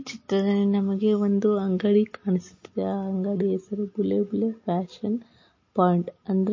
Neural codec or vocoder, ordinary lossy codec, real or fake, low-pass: none; MP3, 32 kbps; real; 7.2 kHz